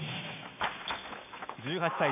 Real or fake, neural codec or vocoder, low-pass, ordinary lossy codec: fake; autoencoder, 48 kHz, 128 numbers a frame, DAC-VAE, trained on Japanese speech; 3.6 kHz; none